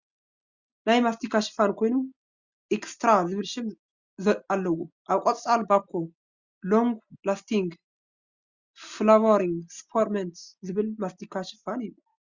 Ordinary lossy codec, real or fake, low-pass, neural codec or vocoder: Opus, 64 kbps; real; 7.2 kHz; none